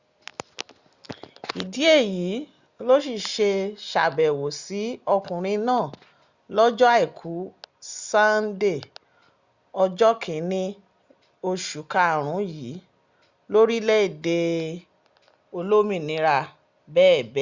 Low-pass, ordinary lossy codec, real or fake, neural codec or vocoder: 7.2 kHz; Opus, 64 kbps; real; none